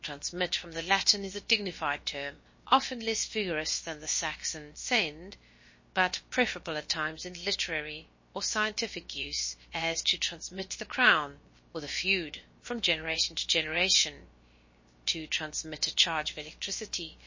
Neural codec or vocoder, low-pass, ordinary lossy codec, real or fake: codec, 16 kHz, about 1 kbps, DyCAST, with the encoder's durations; 7.2 kHz; MP3, 32 kbps; fake